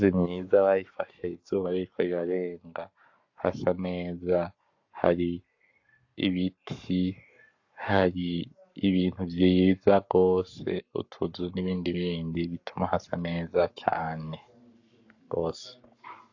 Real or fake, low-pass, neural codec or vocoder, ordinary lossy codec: fake; 7.2 kHz; codec, 44.1 kHz, 7.8 kbps, Pupu-Codec; AAC, 48 kbps